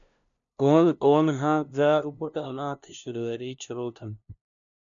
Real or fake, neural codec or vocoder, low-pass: fake; codec, 16 kHz, 0.5 kbps, FunCodec, trained on LibriTTS, 25 frames a second; 7.2 kHz